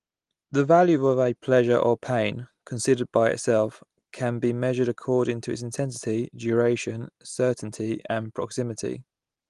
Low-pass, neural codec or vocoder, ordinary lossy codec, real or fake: 10.8 kHz; none; Opus, 24 kbps; real